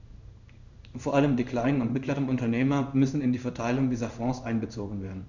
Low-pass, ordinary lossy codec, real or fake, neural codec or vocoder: 7.2 kHz; none; fake; codec, 16 kHz in and 24 kHz out, 1 kbps, XY-Tokenizer